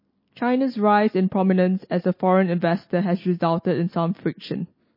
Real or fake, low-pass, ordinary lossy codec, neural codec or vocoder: real; 5.4 kHz; MP3, 24 kbps; none